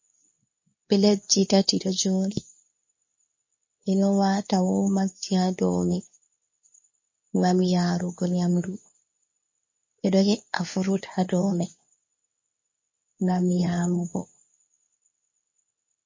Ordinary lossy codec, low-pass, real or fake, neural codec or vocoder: MP3, 32 kbps; 7.2 kHz; fake; codec, 24 kHz, 0.9 kbps, WavTokenizer, medium speech release version 2